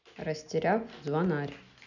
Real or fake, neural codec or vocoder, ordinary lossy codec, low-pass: real; none; none; 7.2 kHz